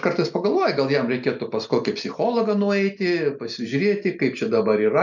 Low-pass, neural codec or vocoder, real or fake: 7.2 kHz; none; real